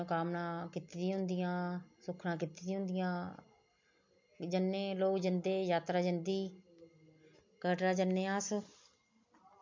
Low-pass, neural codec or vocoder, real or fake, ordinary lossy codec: 7.2 kHz; none; real; MP3, 48 kbps